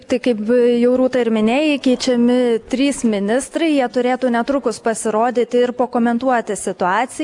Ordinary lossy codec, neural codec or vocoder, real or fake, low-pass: AAC, 64 kbps; none; real; 10.8 kHz